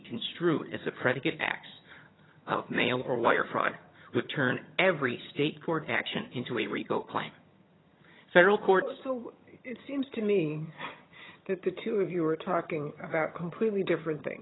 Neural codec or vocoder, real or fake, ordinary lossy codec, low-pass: vocoder, 22.05 kHz, 80 mel bands, HiFi-GAN; fake; AAC, 16 kbps; 7.2 kHz